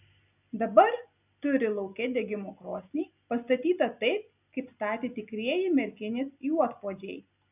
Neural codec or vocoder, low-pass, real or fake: none; 3.6 kHz; real